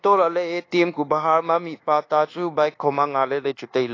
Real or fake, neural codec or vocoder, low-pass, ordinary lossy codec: fake; codec, 24 kHz, 1.2 kbps, DualCodec; 7.2 kHz; AAC, 32 kbps